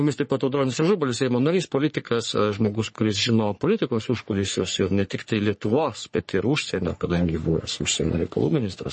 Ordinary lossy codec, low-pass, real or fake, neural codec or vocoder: MP3, 32 kbps; 10.8 kHz; fake; codec, 44.1 kHz, 3.4 kbps, Pupu-Codec